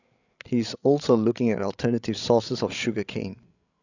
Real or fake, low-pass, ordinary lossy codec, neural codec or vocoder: fake; 7.2 kHz; none; codec, 16 kHz, 16 kbps, FreqCodec, larger model